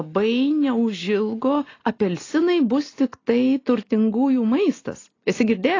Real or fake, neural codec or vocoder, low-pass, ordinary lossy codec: real; none; 7.2 kHz; AAC, 32 kbps